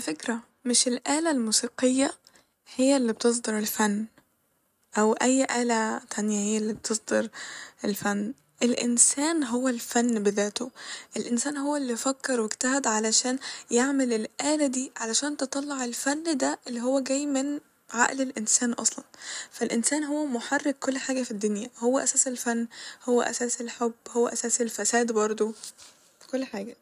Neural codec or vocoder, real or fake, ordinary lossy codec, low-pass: none; real; none; 14.4 kHz